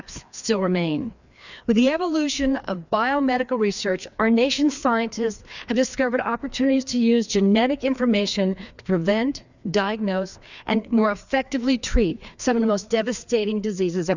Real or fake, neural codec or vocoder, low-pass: fake; codec, 16 kHz, 2 kbps, FreqCodec, larger model; 7.2 kHz